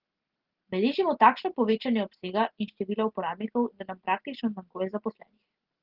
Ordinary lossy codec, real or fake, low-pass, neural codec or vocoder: Opus, 16 kbps; real; 5.4 kHz; none